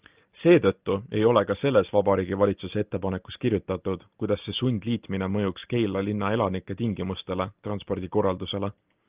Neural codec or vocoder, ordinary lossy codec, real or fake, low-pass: none; Opus, 24 kbps; real; 3.6 kHz